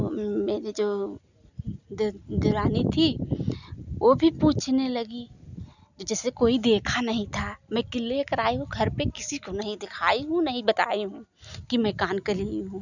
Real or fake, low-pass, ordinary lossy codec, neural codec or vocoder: real; 7.2 kHz; none; none